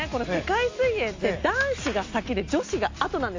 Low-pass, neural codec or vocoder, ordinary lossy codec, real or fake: 7.2 kHz; none; AAC, 48 kbps; real